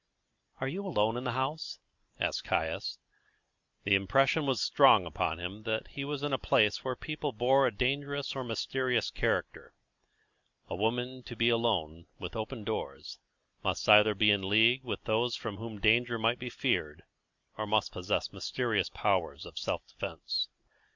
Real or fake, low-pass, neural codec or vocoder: real; 7.2 kHz; none